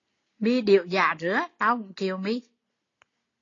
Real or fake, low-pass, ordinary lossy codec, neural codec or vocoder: real; 7.2 kHz; AAC, 32 kbps; none